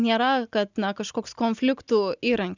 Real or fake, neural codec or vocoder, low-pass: real; none; 7.2 kHz